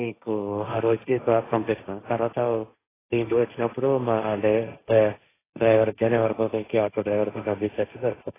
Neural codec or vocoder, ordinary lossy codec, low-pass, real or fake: codec, 16 kHz, 1.1 kbps, Voila-Tokenizer; AAC, 16 kbps; 3.6 kHz; fake